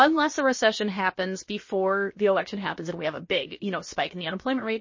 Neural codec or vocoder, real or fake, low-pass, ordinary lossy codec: codec, 16 kHz, about 1 kbps, DyCAST, with the encoder's durations; fake; 7.2 kHz; MP3, 32 kbps